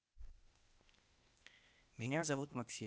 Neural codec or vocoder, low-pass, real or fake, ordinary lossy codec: codec, 16 kHz, 0.8 kbps, ZipCodec; none; fake; none